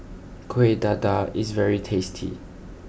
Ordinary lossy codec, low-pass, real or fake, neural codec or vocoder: none; none; real; none